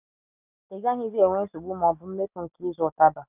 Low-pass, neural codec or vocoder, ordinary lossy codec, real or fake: 3.6 kHz; none; none; real